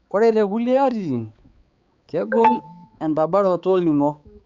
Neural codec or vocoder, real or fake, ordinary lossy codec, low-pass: codec, 16 kHz, 4 kbps, X-Codec, HuBERT features, trained on balanced general audio; fake; Opus, 64 kbps; 7.2 kHz